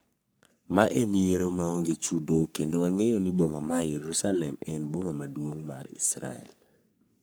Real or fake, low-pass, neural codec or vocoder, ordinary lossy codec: fake; none; codec, 44.1 kHz, 3.4 kbps, Pupu-Codec; none